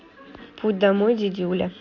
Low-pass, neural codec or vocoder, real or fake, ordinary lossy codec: 7.2 kHz; none; real; Opus, 64 kbps